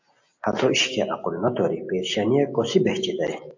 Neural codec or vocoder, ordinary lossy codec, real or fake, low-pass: none; AAC, 48 kbps; real; 7.2 kHz